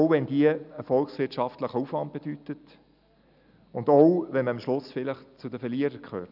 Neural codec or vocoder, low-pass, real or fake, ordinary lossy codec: none; 5.4 kHz; real; none